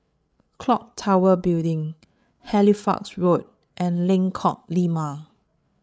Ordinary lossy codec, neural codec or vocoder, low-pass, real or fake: none; codec, 16 kHz, 8 kbps, FreqCodec, larger model; none; fake